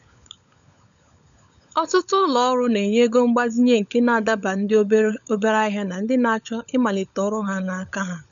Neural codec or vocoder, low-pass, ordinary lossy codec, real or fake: codec, 16 kHz, 16 kbps, FunCodec, trained on LibriTTS, 50 frames a second; 7.2 kHz; none; fake